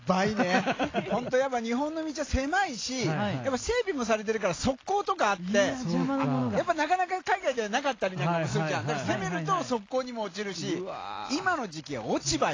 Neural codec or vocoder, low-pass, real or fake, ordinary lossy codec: none; 7.2 kHz; real; AAC, 32 kbps